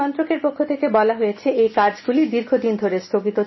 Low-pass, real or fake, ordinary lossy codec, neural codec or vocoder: 7.2 kHz; real; MP3, 24 kbps; none